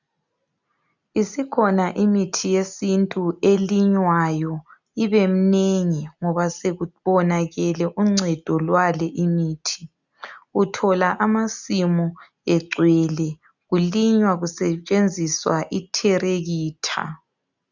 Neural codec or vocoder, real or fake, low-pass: none; real; 7.2 kHz